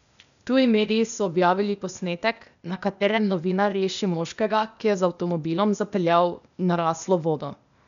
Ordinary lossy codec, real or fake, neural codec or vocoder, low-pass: none; fake; codec, 16 kHz, 0.8 kbps, ZipCodec; 7.2 kHz